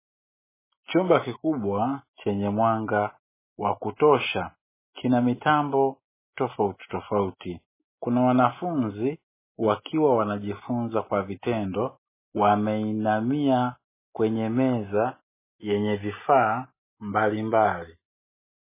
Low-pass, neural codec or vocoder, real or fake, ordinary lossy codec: 3.6 kHz; none; real; MP3, 16 kbps